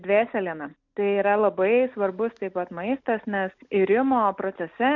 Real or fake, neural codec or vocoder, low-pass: real; none; 7.2 kHz